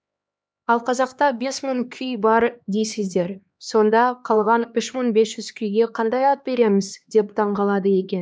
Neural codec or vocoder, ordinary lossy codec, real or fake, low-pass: codec, 16 kHz, 2 kbps, X-Codec, HuBERT features, trained on LibriSpeech; none; fake; none